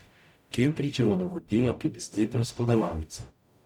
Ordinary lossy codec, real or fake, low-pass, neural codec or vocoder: none; fake; 19.8 kHz; codec, 44.1 kHz, 0.9 kbps, DAC